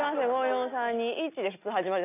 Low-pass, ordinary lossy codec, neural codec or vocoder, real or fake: 3.6 kHz; none; none; real